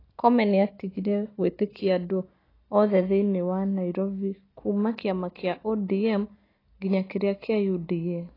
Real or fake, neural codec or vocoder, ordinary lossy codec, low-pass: real; none; AAC, 24 kbps; 5.4 kHz